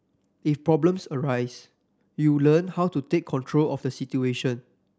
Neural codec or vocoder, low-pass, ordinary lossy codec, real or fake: none; none; none; real